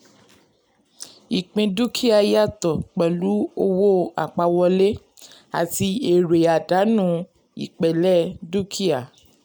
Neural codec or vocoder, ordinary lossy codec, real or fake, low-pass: none; none; real; none